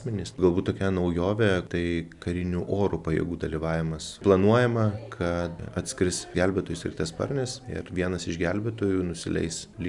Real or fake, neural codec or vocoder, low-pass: real; none; 10.8 kHz